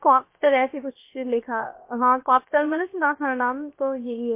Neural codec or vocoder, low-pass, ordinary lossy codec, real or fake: codec, 16 kHz, 0.7 kbps, FocalCodec; 3.6 kHz; MP3, 24 kbps; fake